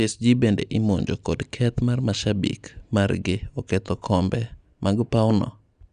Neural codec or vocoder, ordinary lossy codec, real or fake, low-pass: none; Opus, 64 kbps; real; 9.9 kHz